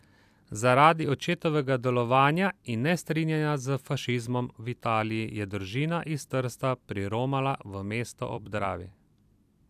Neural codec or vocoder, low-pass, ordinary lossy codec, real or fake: vocoder, 44.1 kHz, 128 mel bands every 256 samples, BigVGAN v2; 14.4 kHz; AAC, 96 kbps; fake